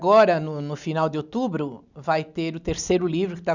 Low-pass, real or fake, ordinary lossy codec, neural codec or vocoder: 7.2 kHz; real; none; none